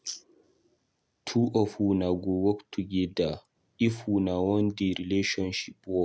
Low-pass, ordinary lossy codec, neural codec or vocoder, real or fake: none; none; none; real